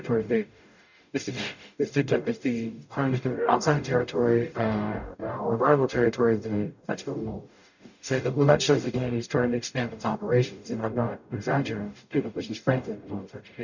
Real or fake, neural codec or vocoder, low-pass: fake; codec, 44.1 kHz, 0.9 kbps, DAC; 7.2 kHz